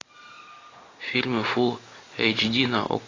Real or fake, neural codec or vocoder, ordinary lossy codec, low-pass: real; none; AAC, 32 kbps; 7.2 kHz